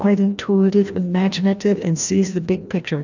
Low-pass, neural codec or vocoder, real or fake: 7.2 kHz; codec, 16 kHz, 1 kbps, FreqCodec, larger model; fake